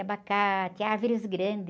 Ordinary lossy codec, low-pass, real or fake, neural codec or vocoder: none; none; real; none